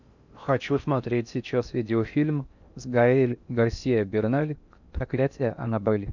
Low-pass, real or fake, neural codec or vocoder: 7.2 kHz; fake; codec, 16 kHz in and 24 kHz out, 0.6 kbps, FocalCodec, streaming, 4096 codes